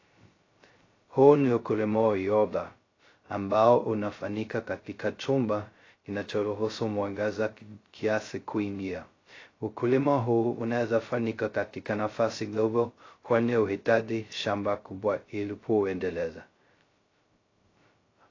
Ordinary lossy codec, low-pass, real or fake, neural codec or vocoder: AAC, 32 kbps; 7.2 kHz; fake; codec, 16 kHz, 0.2 kbps, FocalCodec